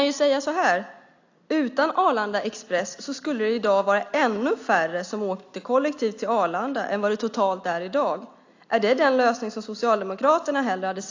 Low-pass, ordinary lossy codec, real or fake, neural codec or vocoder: 7.2 kHz; AAC, 48 kbps; real; none